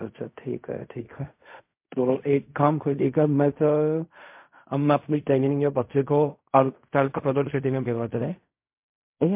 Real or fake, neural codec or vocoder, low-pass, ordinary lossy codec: fake; codec, 16 kHz in and 24 kHz out, 0.4 kbps, LongCat-Audio-Codec, fine tuned four codebook decoder; 3.6 kHz; MP3, 32 kbps